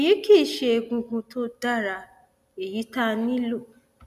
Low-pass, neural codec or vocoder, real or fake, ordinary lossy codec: 14.4 kHz; none; real; none